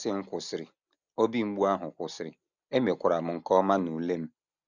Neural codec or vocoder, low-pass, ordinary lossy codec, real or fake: none; 7.2 kHz; none; real